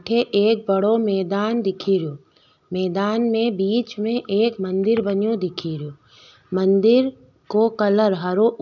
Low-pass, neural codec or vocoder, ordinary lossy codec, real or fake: 7.2 kHz; none; none; real